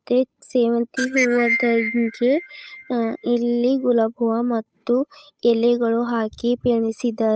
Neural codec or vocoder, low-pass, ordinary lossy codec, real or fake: codec, 16 kHz, 8 kbps, FunCodec, trained on Chinese and English, 25 frames a second; none; none; fake